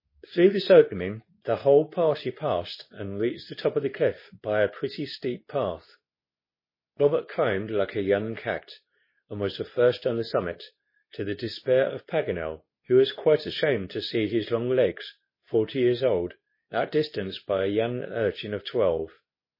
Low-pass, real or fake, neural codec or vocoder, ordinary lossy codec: 5.4 kHz; fake; codec, 24 kHz, 0.9 kbps, WavTokenizer, medium speech release version 2; MP3, 24 kbps